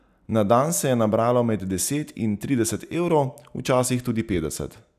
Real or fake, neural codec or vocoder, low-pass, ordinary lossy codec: real; none; 14.4 kHz; none